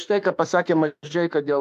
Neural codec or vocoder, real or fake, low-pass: autoencoder, 48 kHz, 32 numbers a frame, DAC-VAE, trained on Japanese speech; fake; 14.4 kHz